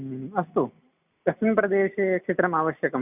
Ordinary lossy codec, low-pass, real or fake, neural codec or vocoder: none; 3.6 kHz; real; none